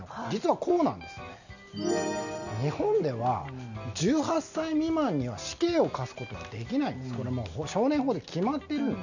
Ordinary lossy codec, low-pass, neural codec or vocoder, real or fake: none; 7.2 kHz; none; real